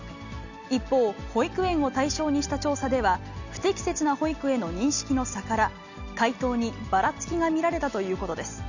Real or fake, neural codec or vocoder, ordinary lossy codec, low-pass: real; none; none; 7.2 kHz